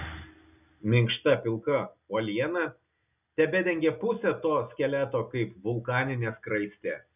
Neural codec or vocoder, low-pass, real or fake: none; 3.6 kHz; real